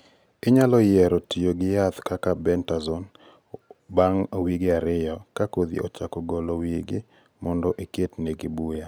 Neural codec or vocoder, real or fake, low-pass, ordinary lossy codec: none; real; none; none